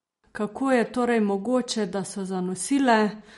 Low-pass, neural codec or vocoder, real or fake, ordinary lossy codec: 19.8 kHz; none; real; MP3, 48 kbps